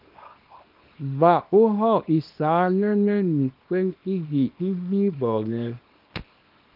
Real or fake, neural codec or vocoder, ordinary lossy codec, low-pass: fake; codec, 24 kHz, 0.9 kbps, WavTokenizer, small release; Opus, 32 kbps; 5.4 kHz